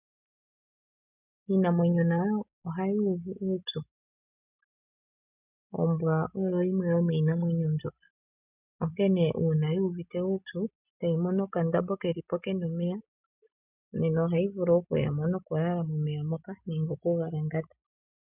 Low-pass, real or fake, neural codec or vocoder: 3.6 kHz; real; none